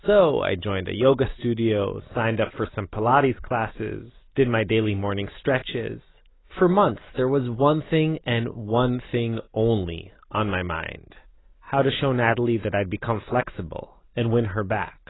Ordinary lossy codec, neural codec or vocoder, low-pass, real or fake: AAC, 16 kbps; none; 7.2 kHz; real